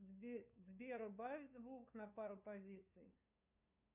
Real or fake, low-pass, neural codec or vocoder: fake; 3.6 kHz; codec, 16 kHz, 2 kbps, FunCodec, trained on LibriTTS, 25 frames a second